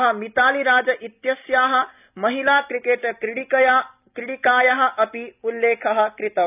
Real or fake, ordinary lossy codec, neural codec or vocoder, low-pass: real; none; none; 3.6 kHz